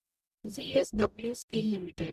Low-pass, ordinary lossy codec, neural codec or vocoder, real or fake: 14.4 kHz; none; codec, 44.1 kHz, 0.9 kbps, DAC; fake